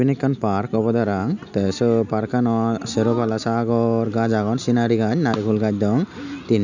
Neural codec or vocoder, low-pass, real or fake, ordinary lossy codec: none; 7.2 kHz; real; none